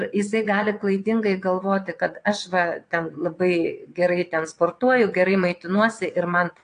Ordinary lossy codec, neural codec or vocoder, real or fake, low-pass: AAC, 48 kbps; vocoder, 22.05 kHz, 80 mel bands, WaveNeXt; fake; 9.9 kHz